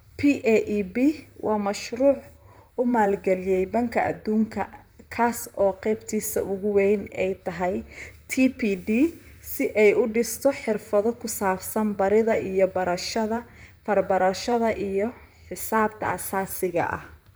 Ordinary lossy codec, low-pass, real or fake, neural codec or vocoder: none; none; fake; vocoder, 44.1 kHz, 128 mel bands, Pupu-Vocoder